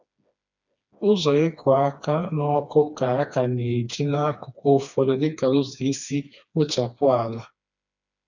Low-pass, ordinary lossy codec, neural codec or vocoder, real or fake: 7.2 kHz; none; codec, 16 kHz, 2 kbps, FreqCodec, smaller model; fake